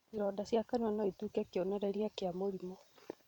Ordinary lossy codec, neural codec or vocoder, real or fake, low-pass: none; vocoder, 44.1 kHz, 128 mel bands every 512 samples, BigVGAN v2; fake; 19.8 kHz